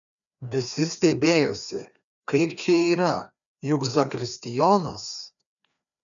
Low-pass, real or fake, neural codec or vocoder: 7.2 kHz; fake; codec, 16 kHz, 2 kbps, FreqCodec, larger model